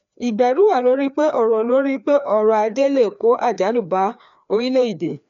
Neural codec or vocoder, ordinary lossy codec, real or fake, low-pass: codec, 16 kHz, 2 kbps, FreqCodec, larger model; none; fake; 7.2 kHz